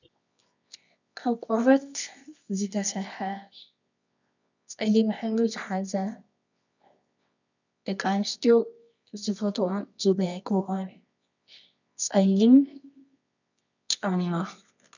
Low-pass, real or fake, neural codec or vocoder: 7.2 kHz; fake; codec, 24 kHz, 0.9 kbps, WavTokenizer, medium music audio release